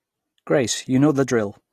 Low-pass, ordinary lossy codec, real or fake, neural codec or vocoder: 14.4 kHz; AAC, 48 kbps; real; none